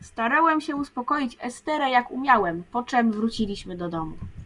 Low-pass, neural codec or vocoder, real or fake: 10.8 kHz; none; real